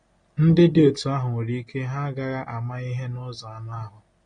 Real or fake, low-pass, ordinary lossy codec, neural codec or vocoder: real; 9.9 kHz; AAC, 32 kbps; none